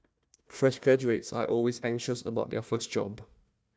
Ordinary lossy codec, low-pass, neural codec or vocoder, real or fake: none; none; codec, 16 kHz, 1 kbps, FunCodec, trained on Chinese and English, 50 frames a second; fake